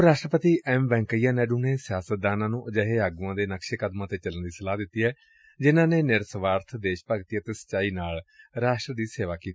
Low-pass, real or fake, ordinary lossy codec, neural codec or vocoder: none; real; none; none